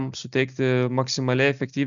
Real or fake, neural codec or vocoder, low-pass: real; none; 7.2 kHz